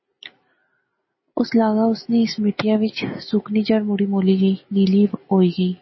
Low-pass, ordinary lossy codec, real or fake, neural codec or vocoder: 7.2 kHz; MP3, 24 kbps; real; none